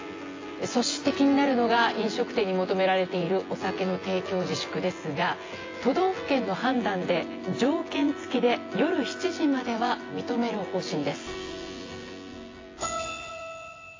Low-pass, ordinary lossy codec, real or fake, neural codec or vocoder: 7.2 kHz; AAC, 32 kbps; fake; vocoder, 24 kHz, 100 mel bands, Vocos